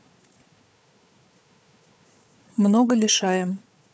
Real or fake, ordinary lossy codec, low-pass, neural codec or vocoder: fake; none; none; codec, 16 kHz, 4 kbps, FunCodec, trained on Chinese and English, 50 frames a second